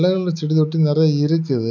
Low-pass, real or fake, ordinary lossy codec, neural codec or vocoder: 7.2 kHz; real; none; none